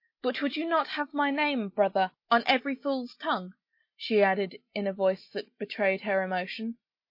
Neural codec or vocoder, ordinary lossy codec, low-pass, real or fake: none; MP3, 32 kbps; 5.4 kHz; real